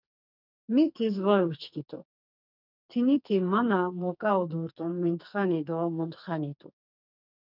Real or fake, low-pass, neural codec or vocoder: fake; 5.4 kHz; codec, 44.1 kHz, 2.6 kbps, SNAC